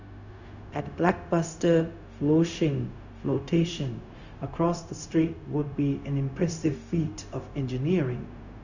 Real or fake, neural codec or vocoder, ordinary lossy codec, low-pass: fake; codec, 16 kHz, 0.4 kbps, LongCat-Audio-Codec; none; 7.2 kHz